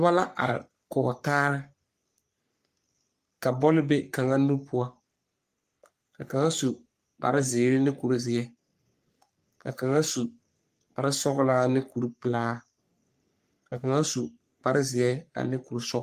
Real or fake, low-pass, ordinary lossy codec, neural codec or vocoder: fake; 14.4 kHz; Opus, 32 kbps; codec, 44.1 kHz, 3.4 kbps, Pupu-Codec